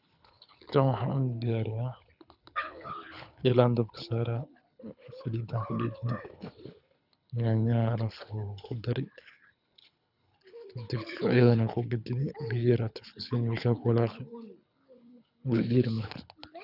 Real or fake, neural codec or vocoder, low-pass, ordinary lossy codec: fake; codec, 24 kHz, 6 kbps, HILCodec; 5.4 kHz; none